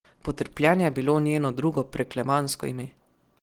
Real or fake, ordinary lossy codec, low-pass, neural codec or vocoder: real; Opus, 16 kbps; 19.8 kHz; none